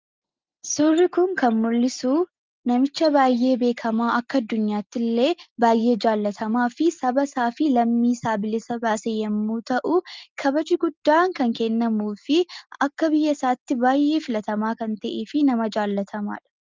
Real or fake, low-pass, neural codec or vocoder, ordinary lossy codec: real; 7.2 kHz; none; Opus, 24 kbps